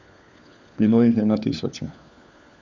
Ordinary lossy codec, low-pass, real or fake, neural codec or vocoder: none; none; fake; codec, 16 kHz, 4 kbps, FunCodec, trained on LibriTTS, 50 frames a second